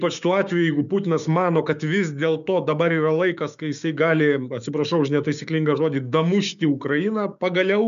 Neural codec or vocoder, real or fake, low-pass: codec, 16 kHz, 6 kbps, DAC; fake; 7.2 kHz